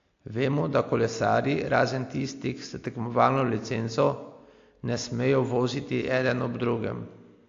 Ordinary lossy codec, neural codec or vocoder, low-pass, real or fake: AAC, 48 kbps; none; 7.2 kHz; real